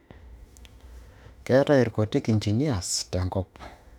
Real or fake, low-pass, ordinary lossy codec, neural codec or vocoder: fake; 19.8 kHz; none; autoencoder, 48 kHz, 32 numbers a frame, DAC-VAE, trained on Japanese speech